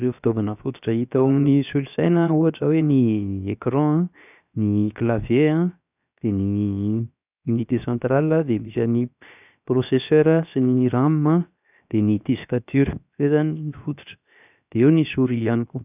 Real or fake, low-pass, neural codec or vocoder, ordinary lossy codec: fake; 3.6 kHz; codec, 16 kHz, 0.7 kbps, FocalCodec; none